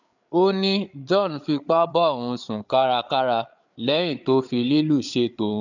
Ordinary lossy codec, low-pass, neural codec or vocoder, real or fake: none; 7.2 kHz; codec, 16 kHz, 8 kbps, FreqCodec, larger model; fake